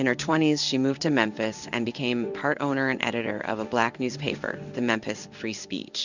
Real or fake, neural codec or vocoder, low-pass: fake; codec, 16 kHz in and 24 kHz out, 1 kbps, XY-Tokenizer; 7.2 kHz